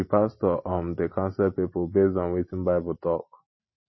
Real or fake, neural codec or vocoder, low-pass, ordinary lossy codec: real; none; 7.2 kHz; MP3, 24 kbps